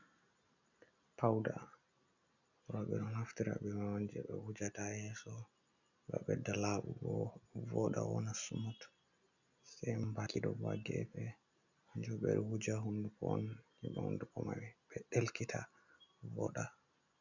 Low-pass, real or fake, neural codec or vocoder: 7.2 kHz; real; none